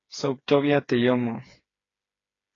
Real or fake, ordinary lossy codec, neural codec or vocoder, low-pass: fake; AAC, 32 kbps; codec, 16 kHz, 4 kbps, FreqCodec, smaller model; 7.2 kHz